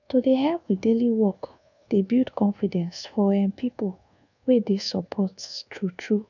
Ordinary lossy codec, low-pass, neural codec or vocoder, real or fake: none; 7.2 kHz; codec, 24 kHz, 1.2 kbps, DualCodec; fake